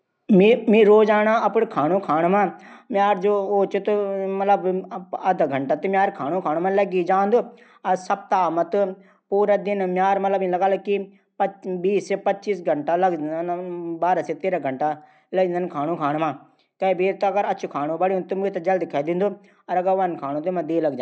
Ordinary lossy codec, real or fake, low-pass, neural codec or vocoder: none; real; none; none